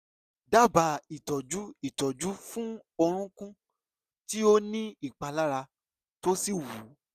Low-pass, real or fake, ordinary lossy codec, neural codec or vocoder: 14.4 kHz; real; none; none